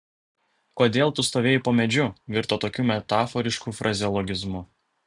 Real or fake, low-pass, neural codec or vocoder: real; 10.8 kHz; none